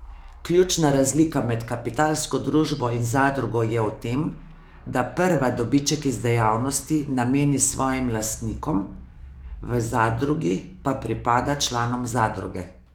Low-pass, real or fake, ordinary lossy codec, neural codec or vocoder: 19.8 kHz; fake; none; codec, 44.1 kHz, 7.8 kbps, DAC